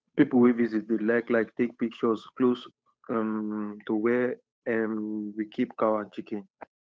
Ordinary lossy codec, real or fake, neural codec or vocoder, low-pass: none; fake; codec, 16 kHz, 8 kbps, FunCodec, trained on Chinese and English, 25 frames a second; none